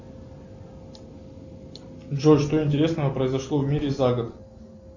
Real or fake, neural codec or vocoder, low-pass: real; none; 7.2 kHz